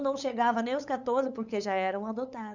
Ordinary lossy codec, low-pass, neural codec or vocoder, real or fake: none; 7.2 kHz; codec, 16 kHz, 8 kbps, FunCodec, trained on LibriTTS, 25 frames a second; fake